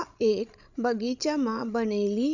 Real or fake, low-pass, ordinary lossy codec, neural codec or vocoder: fake; 7.2 kHz; none; codec, 16 kHz, 16 kbps, FreqCodec, larger model